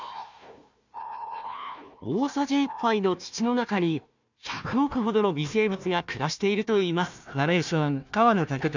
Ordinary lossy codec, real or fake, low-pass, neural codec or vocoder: none; fake; 7.2 kHz; codec, 16 kHz, 1 kbps, FunCodec, trained on Chinese and English, 50 frames a second